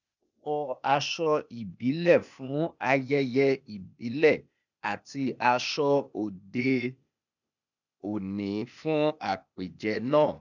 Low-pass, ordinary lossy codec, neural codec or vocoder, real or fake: 7.2 kHz; none; codec, 16 kHz, 0.8 kbps, ZipCodec; fake